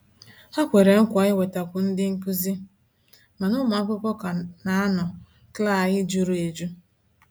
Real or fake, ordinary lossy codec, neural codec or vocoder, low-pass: real; none; none; none